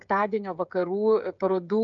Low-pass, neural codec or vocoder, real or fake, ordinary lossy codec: 7.2 kHz; codec, 16 kHz, 16 kbps, FreqCodec, smaller model; fake; MP3, 96 kbps